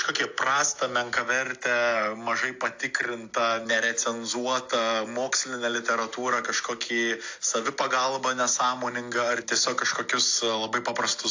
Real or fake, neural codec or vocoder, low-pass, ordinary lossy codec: real; none; 7.2 kHz; AAC, 48 kbps